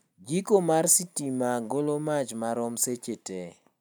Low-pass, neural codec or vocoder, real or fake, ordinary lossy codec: none; none; real; none